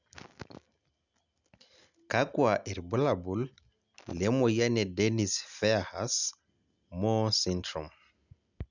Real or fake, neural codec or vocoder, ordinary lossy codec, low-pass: real; none; none; 7.2 kHz